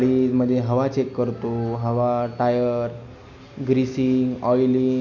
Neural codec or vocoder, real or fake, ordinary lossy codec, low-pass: none; real; none; 7.2 kHz